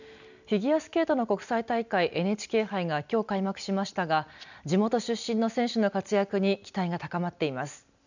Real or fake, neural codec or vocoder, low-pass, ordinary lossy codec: real; none; 7.2 kHz; none